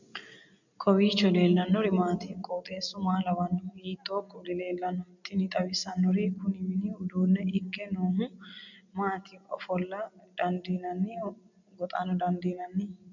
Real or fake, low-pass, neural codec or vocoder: real; 7.2 kHz; none